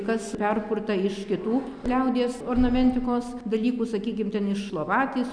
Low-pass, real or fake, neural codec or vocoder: 9.9 kHz; real; none